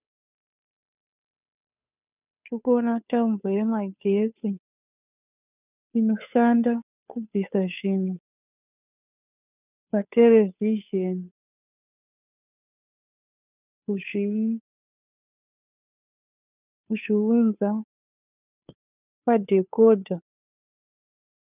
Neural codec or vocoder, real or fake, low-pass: codec, 16 kHz, 2 kbps, FunCodec, trained on Chinese and English, 25 frames a second; fake; 3.6 kHz